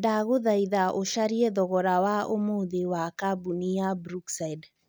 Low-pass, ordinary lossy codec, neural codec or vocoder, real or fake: none; none; none; real